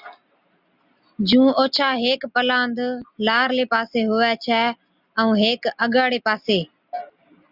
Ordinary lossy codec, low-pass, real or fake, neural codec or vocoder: Opus, 64 kbps; 5.4 kHz; real; none